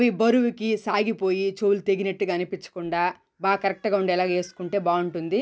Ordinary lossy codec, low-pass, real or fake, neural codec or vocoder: none; none; real; none